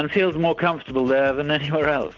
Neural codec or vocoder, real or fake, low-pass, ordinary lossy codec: none; real; 7.2 kHz; Opus, 32 kbps